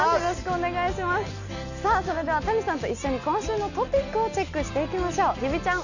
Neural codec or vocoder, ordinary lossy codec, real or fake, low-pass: none; none; real; 7.2 kHz